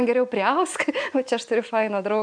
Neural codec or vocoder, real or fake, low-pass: none; real; 9.9 kHz